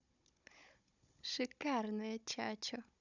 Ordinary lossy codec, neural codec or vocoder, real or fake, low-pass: none; codec, 16 kHz, 16 kbps, FunCodec, trained on Chinese and English, 50 frames a second; fake; 7.2 kHz